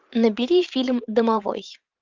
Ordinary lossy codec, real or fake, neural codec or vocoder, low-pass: Opus, 24 kbps; fake; vocoder, 44.1 kHz, 128 mel bands every 512 samples, BigVGAN v2; 7.2 kHz